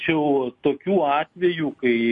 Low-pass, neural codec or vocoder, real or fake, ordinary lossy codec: 10.8 kHz; none; real; MP3, 48 kbps